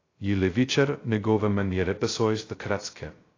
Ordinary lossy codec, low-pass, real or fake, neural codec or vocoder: AAC, 32 kbps; 7.2 kHz; fake; codec, 16 kHz, 0.2 kbps, FocalCodec